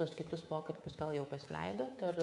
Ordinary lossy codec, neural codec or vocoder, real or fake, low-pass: MP3, 64 kbps; codec, 24 kHz, 3.1 kbps, DualCodec; fake; 10.8 kHz